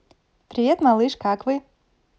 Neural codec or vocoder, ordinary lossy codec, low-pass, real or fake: none; none; none; real